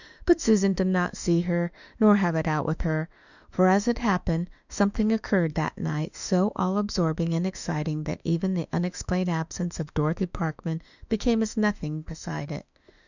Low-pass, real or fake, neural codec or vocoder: 7.2 kHz; fake; autoencoder, 48 kHz, 32 numbers a frame, DAC-VAE, trained on Japanese speech